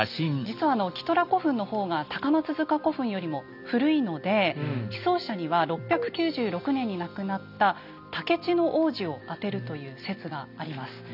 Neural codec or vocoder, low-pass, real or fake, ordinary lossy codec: none; 5.4 kHz; real; none